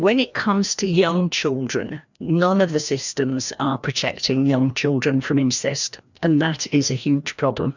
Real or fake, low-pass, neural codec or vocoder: fake; 7.2 kHz; codec, 16 kHz, 1 kbps, FreqCodec, larger model